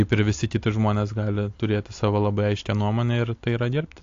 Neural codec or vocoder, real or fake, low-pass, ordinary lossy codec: none; real; 7.2 kHz; AAC, 48 kbps